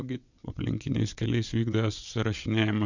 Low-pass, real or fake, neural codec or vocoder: 7.2 kHz; fake; vocoder, 22.05 kHz, 80 mel bands, WaveNeXt